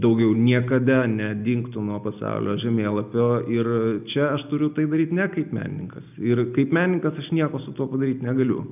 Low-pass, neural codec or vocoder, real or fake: 3.6 kHz; none; real